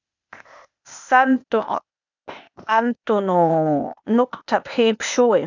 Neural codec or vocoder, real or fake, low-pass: codec, 16 kHz, 0.8 kbps, ZipCodec; fake; 7.2 kHz